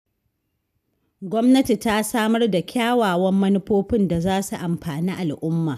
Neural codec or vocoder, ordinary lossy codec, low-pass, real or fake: none; none; 14.4 kHz; real